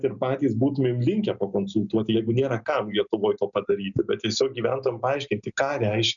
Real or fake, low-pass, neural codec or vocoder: real; 7.2 kHz; none